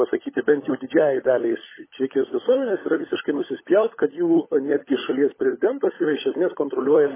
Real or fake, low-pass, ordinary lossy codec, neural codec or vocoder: fake; 3.6 kHz; MP3, 16 kbps; codec, 16 kHz, 16 kbps, FunCodec, trained on LibriTTS, 50 frames a second